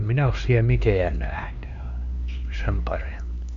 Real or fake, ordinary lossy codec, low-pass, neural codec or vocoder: fake; none; 7.2 kHz; codec, 16 kHz, 2 kbps, X-Codec, HuBERT features, trained on LibriSpeech